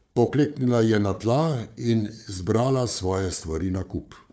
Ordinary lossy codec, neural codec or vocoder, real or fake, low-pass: none; codec, 16 kHz, 4 kbps, FunCodec, trained on Chinese and English, 50 frames a second; fake; none